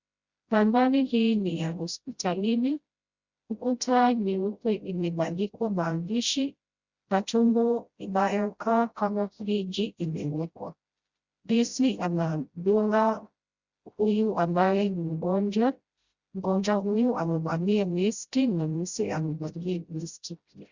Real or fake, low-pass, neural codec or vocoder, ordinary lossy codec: fake; 7.2 kHz; codec, 16 kHz, 0.5 kbps, FreqCodec, smaller model; Opus, 64 kbps